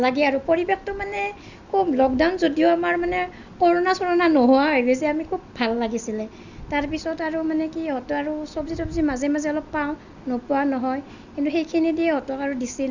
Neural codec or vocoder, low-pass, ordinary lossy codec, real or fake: none; 7.2 kHz; Opus, 64 kbps; real